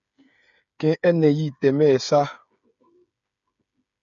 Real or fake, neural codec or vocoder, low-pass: fake; codec, 16 kHz, 8 kbps, FreqCodec, smaller model; 7.2 kHz